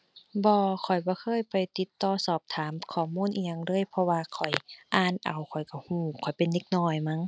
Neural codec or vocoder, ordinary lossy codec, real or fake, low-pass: none; none; real; none